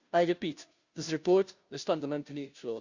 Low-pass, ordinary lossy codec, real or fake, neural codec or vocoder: 7.2 kHz; Opus, 64 kbps; fake; codec, 16 kHz, 0.5 kbps, FunCodec, trained on Chinese and English, 25 frames a second